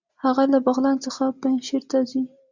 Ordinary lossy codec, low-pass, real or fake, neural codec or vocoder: Opus, 64 kbps; 7.2 kHz; real; none